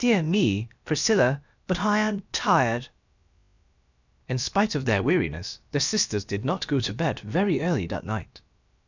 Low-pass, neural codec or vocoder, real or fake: 7.2 kHz; codec, 16 kHz, about 1 kbps, DyCAST, with the encoder's durations; fake